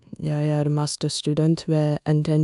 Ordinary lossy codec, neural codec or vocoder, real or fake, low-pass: none; codec, 24 kHz, 1.2 kbps, DualCodec; fake; 10.8 kHz